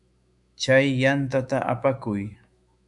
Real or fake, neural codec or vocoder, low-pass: fake; autoencoder, 48 kHz, 128 numbers a frame, DAC-VAE, trained on Japanese speech; 10.8 kHz